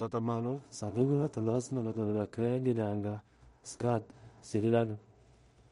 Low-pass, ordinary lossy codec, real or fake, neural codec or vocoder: 10.8 kHz; MP3, 48 kbps; fake; codec, 16 kHz in and 24 kHz out, 0.4 kbps, LongCat-Audio-Codec, two codebook decoder